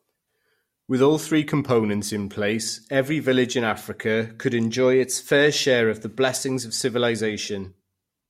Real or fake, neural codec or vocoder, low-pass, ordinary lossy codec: real; none; 19.8 kHz; MP3, 64 kbps